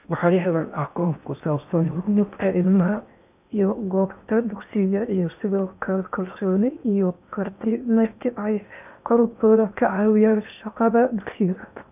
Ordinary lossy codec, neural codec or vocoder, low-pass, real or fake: none; codec, 16 kHz in and 24 kHz out, 0.6 kbps, FocalCodec, streaming, 4096 codes; 3.6 kHz; fake